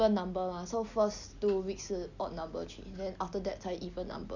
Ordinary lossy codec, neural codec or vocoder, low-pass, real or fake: none; none; 7.2 kHz; real